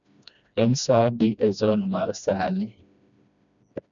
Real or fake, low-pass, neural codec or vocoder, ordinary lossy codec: fake; 7.2 kHz; codec, 16 kHz, 1 kbps, FreqCodec, smaller model; none